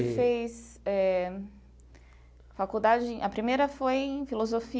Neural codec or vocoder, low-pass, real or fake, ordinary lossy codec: none; none; real; none